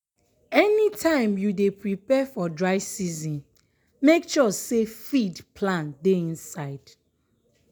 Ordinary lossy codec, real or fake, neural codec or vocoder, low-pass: none; real; none; none